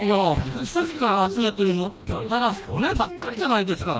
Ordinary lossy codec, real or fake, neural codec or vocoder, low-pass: none; fake; codec, 16 kHz, 1 kbps, FreqCodec, smaller model; none